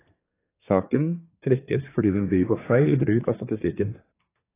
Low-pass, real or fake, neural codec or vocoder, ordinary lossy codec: 3.6 kHz; fake; codec, 24 kHz, 1 kbps, SNAC; AAC, 16 kbps